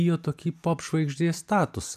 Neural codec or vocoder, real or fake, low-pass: vocoder, 44.1 kHz, 128 mel bands every 256 samples, BigVGAN v2; fake; 14.4 kHz